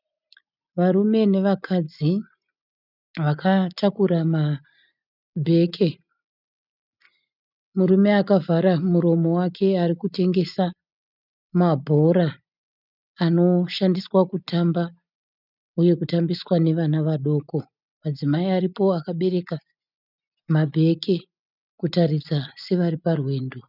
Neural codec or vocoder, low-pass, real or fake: none; 5.4 kHz; real